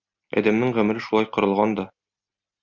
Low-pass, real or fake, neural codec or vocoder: 7.2 kHz; real; none